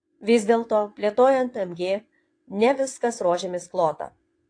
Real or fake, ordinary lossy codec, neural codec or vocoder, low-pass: fake; AAC, 48 kbps; vocoder, 22.05 kHz, 80 mel bands, WaveNeXt; 9.9 kHz